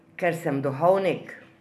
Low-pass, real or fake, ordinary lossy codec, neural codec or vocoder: 14.4 kHz; real; AAC, 96 kbps; none